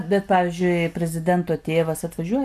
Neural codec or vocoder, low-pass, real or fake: none; 14.4 kHz; real